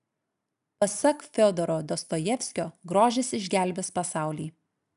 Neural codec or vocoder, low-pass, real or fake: none; 10.8 kHz; real